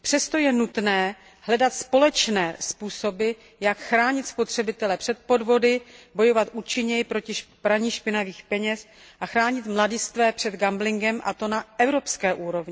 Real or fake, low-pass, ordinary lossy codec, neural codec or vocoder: real; none; none; none